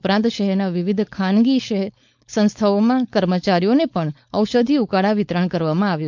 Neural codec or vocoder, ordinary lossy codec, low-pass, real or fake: codec, 16 kHz, 4.8 kbps, FACodec; MP3, 64 kbps; 7.2 kHz; fake